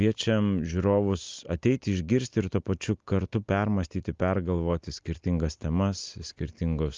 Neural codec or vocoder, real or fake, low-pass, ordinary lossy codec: none; real; 7.2 kHz; Opus, 32 kbps